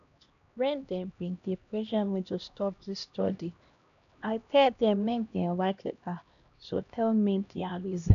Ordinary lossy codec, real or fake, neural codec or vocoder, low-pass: none; fake; codec, 16 kHz, 1 kbps, X-Codec, HuBERT features, trained on LibriSpeech; 7.2 kHz